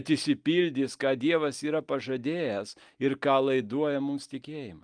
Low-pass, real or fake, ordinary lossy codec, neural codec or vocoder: 9.9 kHz; real; Opus, 32 kbps; none